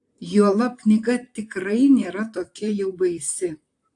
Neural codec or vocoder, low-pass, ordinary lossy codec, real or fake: vocoder, 44.1 kHz, 128 mel bands, Pupu-Vocoder; 10.8 kHz; AAC, 64 kbps; fake